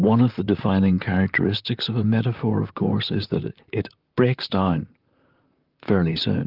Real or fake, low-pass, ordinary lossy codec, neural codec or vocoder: fake; 5.4 kHz; Opus, 24 kbps; vocoder, 44.1 kHz, 128 mel bands, Pupu-Vocoder